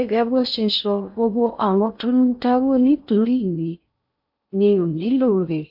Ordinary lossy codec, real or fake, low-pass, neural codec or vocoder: AAC, 48 kbps; fake; 5.4 kHz; codec, 16 kHz in and 24 kHz out, 0.6 kbps, FocalCodec, streaming, 4096 codes